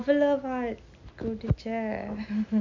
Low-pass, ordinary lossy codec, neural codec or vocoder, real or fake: 7.2 kHz; MP3, 64 kbps; none; real